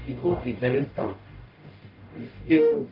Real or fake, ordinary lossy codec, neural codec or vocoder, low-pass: fake; Opus, 24 kbps; codec, 44.1 kHz, 0.9 kbps, DAC; 5.4 kHz